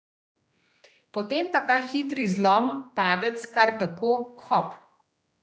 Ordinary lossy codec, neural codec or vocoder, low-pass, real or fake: none; codec, 16 kHz, 1 kbps, X-Codec, HuBERT features, trained on general audio; none; fake